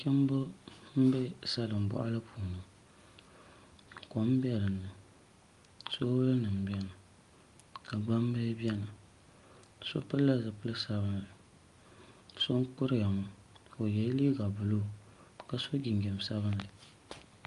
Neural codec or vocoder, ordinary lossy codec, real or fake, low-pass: none; Opus, 32 kbps; real; 10.8 kHz